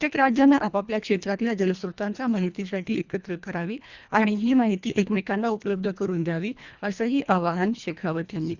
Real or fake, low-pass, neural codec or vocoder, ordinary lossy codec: fake; 7.2 kHz; codec, 24 kHz, 1.5 kbps, HILCodec; Opus, 64 kbps